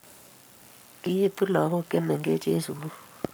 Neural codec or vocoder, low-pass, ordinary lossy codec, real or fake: codec, 44.1 kHz, 7.8 kbps, Pupu-Codec; none; none; fake